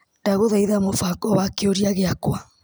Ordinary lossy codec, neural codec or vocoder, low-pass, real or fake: none; none; none; real